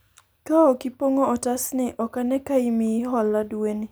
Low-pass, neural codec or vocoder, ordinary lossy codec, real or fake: none; none; none; real